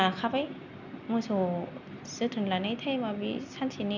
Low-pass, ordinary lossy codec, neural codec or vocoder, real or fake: 7.2 kHz; none; none; real